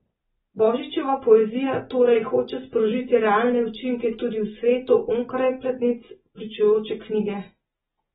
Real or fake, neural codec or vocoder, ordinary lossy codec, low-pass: real; none; AAC, 16 kbps; 10.8 kHz